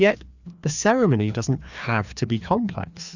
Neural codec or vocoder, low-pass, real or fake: codec, 16 kHz, 2 kbps, FreqCodec, larger model; 7.2 kHz; fake